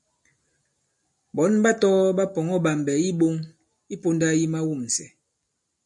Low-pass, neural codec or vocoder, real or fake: 10.8 kHz; none; real